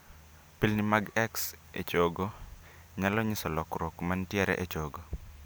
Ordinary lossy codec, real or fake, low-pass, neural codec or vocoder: none; real; none; none